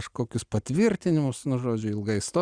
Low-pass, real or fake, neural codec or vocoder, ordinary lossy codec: 9.9 kHz; real; none; Opus, 64 kbps